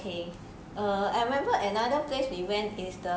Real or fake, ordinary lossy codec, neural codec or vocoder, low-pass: real; none; none; none